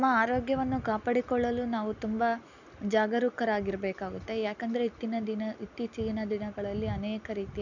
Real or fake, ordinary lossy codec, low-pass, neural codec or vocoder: real; none; 7.2 kHz; none